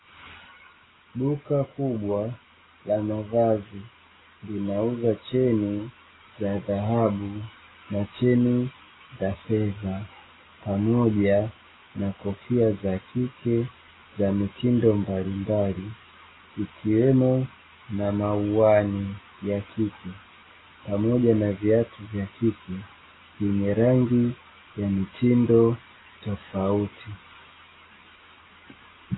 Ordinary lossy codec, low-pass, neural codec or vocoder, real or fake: AAC, 16 kbps; 7.2 kHz; none; real